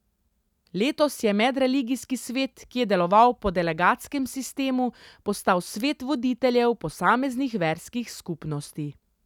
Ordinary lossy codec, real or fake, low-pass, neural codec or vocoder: none; real; 19.8 kHz; none